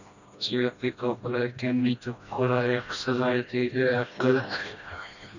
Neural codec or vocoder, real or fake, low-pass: codec, 16 kHz, 1 kbps, FreqCodec, smaller model; fake; 7.2 kHz